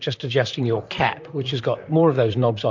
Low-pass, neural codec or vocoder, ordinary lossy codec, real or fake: 7.2 kHz; vocoder, 44.1 kHz, 128 mel bands, Pupu-Vocoder; MP3, 64 kbps; fake